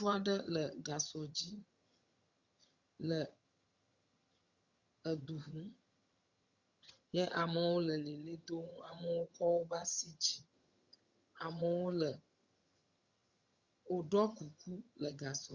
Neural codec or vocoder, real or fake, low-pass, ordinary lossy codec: vocoder, 22.05 kHz, 80 mel bands, HiFi-GAN; fake; 7.2 kHz; Opus, 64 kbps